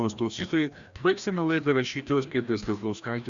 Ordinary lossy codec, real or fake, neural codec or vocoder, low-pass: Opus, 64 kbps; fake; codec, 16 kHz, 1 kbps, FreqCodec, larger model; 7.2 kHz